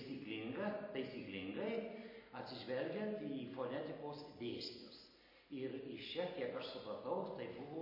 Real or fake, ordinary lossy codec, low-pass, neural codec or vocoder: real; MP3, 24 kbps; 5.4 kHz; none